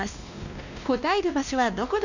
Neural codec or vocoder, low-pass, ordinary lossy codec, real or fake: codec, 16 kHz, 1 kbps, X-Codec, WavLM features, trained on Multilingual LibriSpeech; 7.2 kHz; none; fake